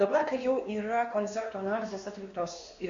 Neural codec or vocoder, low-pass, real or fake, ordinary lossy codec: codec, 16 kHz, 2 kbps, X-Codec, WavLM features, trained on Multilingual LibriSpeech; 7.2 kHz; fake; MP3, 48 kbps